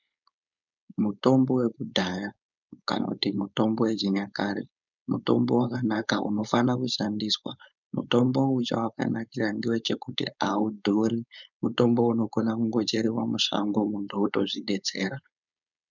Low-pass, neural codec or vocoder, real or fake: 7.2 kHz; codec, 16 kHz, 4.8 kbps, FACodec; fake